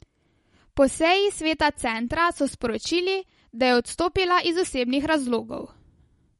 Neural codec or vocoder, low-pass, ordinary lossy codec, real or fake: none; 19.8 kHz; MP3, 48 kbps; real